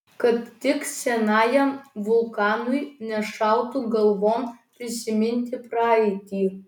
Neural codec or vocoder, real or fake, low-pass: none; real; 19.8 kHz